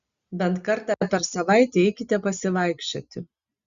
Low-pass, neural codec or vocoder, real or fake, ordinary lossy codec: 7.2 kHz; none; real; Opus, 64 kbps